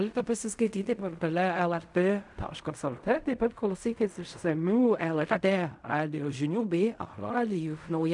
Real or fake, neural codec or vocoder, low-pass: fake; codec, 16 kHz in and 24 kHz out, 0.4 kbps, LongCat-Audio-Codec, fine tuned four codebook decoder; 10.8 kHz